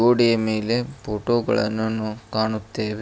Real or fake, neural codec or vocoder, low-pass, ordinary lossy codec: real; none; none; none